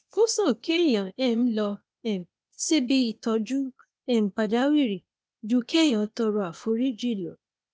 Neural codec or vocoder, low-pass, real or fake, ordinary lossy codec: codec, 16 kHz, 0.8 kbps, ZipCodec; none; fake; none